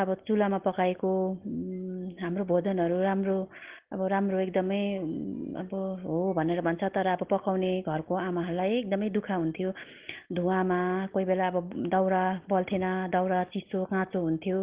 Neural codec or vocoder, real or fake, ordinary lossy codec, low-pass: none; real; Opus, 16 kbps; 3.6 kHz